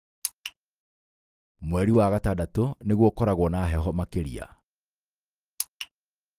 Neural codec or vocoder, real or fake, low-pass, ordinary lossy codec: none; real; 14.4 kHz; Opus, 24 kbps